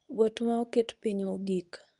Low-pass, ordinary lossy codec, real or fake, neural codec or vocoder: 10.8 kHz; none; fake; codec, 24 kHz, 0.9 kbps, WavTokenizer, medium speech release version 2